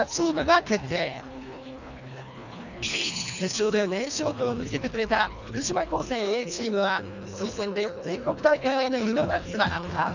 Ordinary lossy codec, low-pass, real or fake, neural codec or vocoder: none; 7.2 kHz; fake; codec, 24 kHz, 1.5 kbps, HILCodec